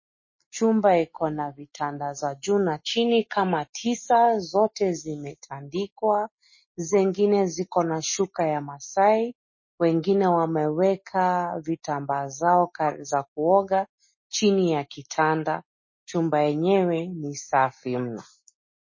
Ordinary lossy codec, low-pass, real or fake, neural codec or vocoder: MP3, 32 kbps; 7.2 kHz; real; none